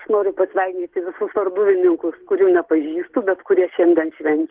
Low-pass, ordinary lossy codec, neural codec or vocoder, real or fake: 3.6 kHz; Opus, 16 kbps; none; real